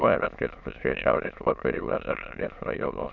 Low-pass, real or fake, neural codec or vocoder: 7.2 kHz; fake; autoencoder, 22.05 kHz, a latent of 192 numbers a frame, VITS, trained on many speakers